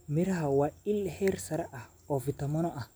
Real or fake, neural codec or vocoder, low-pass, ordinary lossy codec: real; none; none; none